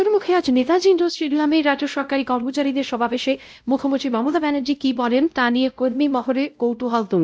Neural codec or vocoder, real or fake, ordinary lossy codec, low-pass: codec, 16 kHz, 0.5 kbps, X-Codec, WavLM features, trained on Multilingual LibriSpeech; fake; none; none